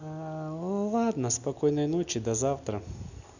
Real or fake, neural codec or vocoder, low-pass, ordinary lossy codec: real; none; 7.2 kHz; none